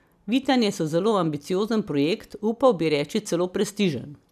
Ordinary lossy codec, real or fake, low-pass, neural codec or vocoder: none; real; 14.4 kHz; none